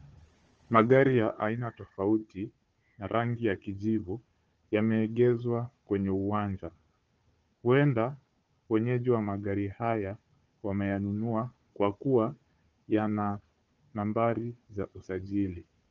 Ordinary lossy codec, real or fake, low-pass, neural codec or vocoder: Opus, 24 kbps; fake; 7.2 kHz; codec, 16 kHz, 4 kbps, FunCodec, trained on Chinese and English, 50 frames a second